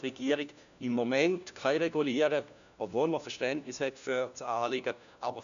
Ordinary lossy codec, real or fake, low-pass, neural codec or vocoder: none; fake; 7.2 kHz; codec, 16 kHz, 1 kbps, FunCodec, trained on LibriTTS, 50 frames a second